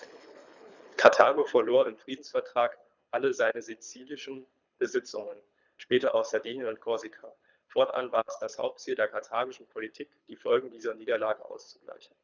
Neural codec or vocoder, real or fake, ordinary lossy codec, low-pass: codec, 24 kHz, 3 kbps, HILCodec; fake; none; 7.2 kHz